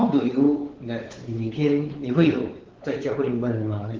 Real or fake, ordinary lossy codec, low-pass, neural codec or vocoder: fake; Opus, 16 kbps; 7.2 kHz; codec, 16 kHz, 4 kbps, X-Codec, WavLM features, trained on Multilingual LibriSpeech